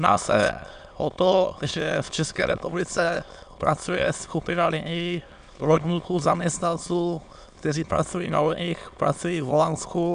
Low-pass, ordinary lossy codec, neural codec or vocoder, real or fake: 9.9 kHz; AAC, 96 kbps; autoencoder, 22.05 kHz, a latent of 192 numbers a frame, VITS, trained on many speakers; fake